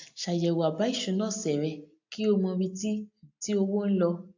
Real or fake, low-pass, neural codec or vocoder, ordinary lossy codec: real; 7.2 kHz; none; AAC, 48 kbps